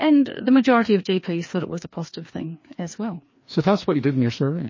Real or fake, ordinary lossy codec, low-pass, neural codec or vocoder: fake; MP3, 32 kbps; 7.2 kHz; codec, 16 kHz, 2 kbps, FreqCodec, larger model